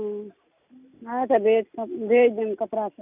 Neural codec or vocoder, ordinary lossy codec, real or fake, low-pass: none; none; real; 3.6 kHz